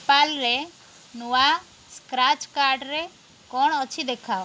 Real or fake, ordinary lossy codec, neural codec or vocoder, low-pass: real; none; none; none